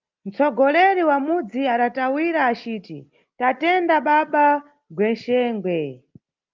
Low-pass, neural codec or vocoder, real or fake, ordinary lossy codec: 7.2 kHz; none; real; Opus, 24 kbps